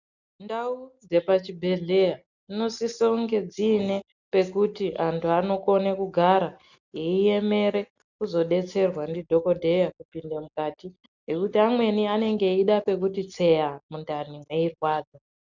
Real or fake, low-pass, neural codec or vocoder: real; 7.2 kHz; none